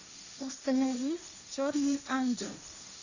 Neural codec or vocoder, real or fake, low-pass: codec, 16 kHz, 1.1 kbps, Voila-Tokenizer; fake; 7.2 kHz